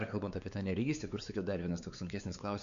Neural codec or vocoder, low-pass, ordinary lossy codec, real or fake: codec, 16 kHz, 4 kbps, X-Codec, WavLM features, trained on Multilingual LibriSpeech; 7.2 kHz; AAC, 96 kbps; fake